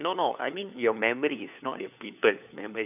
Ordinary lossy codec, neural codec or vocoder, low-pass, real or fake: none; codec, 16 kHz, 4 kbps, FunCodec, trained on LibriTTS, 50 frames a second; 3.6 kHz; fake